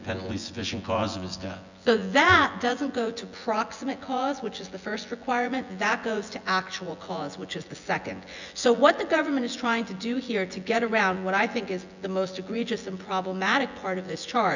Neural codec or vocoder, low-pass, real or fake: vocoder, 24 kHz, 100 mel bands, Vocos; 7.2 kHz; fake